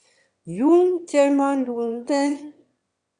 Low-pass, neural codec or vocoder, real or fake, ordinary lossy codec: 9.9 kHz; autoencoder, 22.05 kHz, a latent of 192 numbers a frame, VITS, trained on one speaker; fake; Opus, 64 kbps